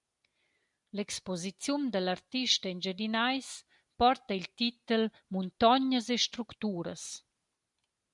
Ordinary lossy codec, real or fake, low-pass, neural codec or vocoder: MP3, 96 kbps; real; 10.8 kHz; none